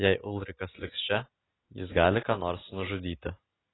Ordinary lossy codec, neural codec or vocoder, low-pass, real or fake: AAC, 16 kbps; none; 7.2 kHz; real